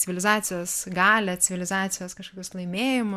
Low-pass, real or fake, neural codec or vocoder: 14.4 kHz; real; none